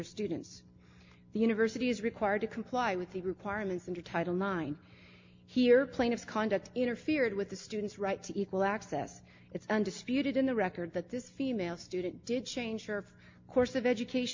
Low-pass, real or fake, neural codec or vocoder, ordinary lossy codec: 7.2 kHz; real; none; MP3, 48 kbps